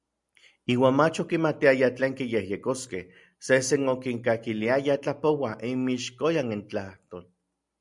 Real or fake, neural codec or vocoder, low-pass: real; none; 10.8 kHz